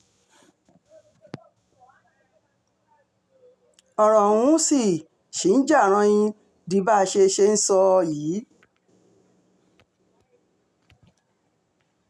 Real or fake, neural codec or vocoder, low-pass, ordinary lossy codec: real; none; none; none